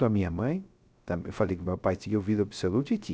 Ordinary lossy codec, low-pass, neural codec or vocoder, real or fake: none; none; codec, 16 kHz, 0.3 kbps, FocalCodec; fake